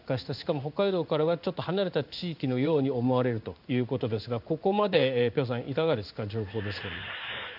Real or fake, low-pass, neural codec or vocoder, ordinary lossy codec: fake; 5.4 kHz; codec, 16 kHz in and 24 kHz out, 1 kbps, XY-Tokenizer; none